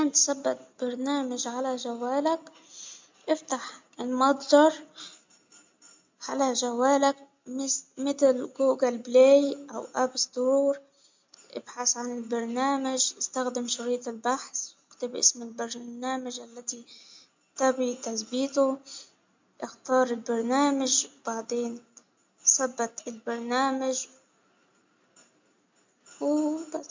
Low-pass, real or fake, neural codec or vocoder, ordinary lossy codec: 7.2 kHz; real; none; MP3, 64 kbps